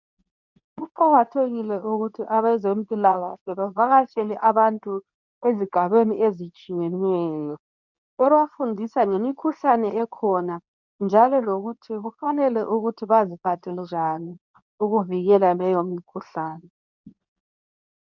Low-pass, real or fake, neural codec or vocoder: 7.2 kHz; fake; codec, 24 kHz, 0.9 kbps, WavTokenizer, medium speech release version 2